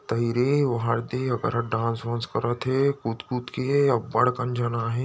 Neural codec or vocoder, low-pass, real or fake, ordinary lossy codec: none; none; real; none